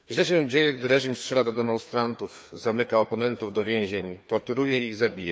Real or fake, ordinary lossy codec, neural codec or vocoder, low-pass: fake; none; codec, 16 kHz, 2 kbps, FreqCodec, larger model; none